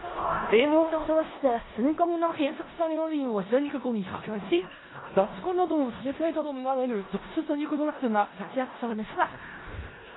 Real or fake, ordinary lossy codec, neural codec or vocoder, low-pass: fake; AAC, 16 kbps; codec, 16 kHz in and 24 kHz out, 0.4 kbps, LongCat-Audio-Codec, four codebook decoder; 7.2 kHz